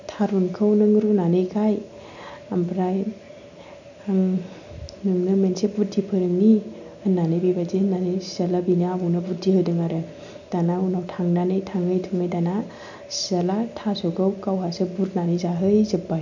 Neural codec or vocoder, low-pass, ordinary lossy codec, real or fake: none; 7.2 kHz; none; real